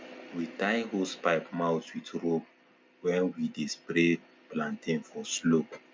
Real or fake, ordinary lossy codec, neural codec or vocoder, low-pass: real; none; none; none